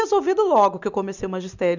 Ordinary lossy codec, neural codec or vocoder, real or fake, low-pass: none; none; real; 7.2 kHz